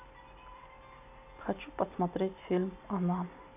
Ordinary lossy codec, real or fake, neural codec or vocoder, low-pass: AAC, 32 kbps; real; none; 3.6 kHz